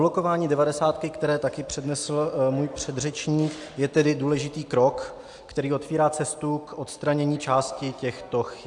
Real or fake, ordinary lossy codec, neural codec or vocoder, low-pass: real; AAC, 64 kbps; none; 10.8 kHz